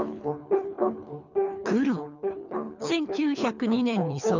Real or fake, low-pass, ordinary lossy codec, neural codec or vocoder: fake; 7.2 kHz; none; codec, 24 kHz, 3 kbps, HILCodec